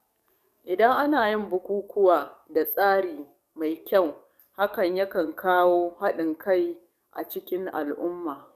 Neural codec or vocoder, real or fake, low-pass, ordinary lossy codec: codec, 44.1 kHz, 7.8 kbps, DAC; fake; 14.4 kHz; none